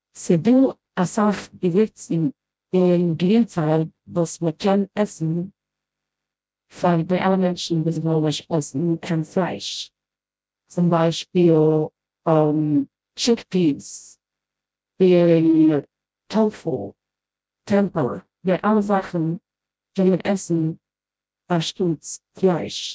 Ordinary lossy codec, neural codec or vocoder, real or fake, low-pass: none; codec, 16 kHz, 0.5 kbps, FreqCodec, smaller model; fake; none